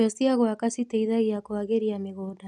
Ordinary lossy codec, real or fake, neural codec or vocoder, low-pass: none; real; none; none